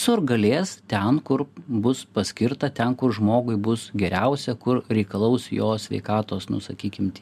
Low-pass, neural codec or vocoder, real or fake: 14.4 kHz; none; real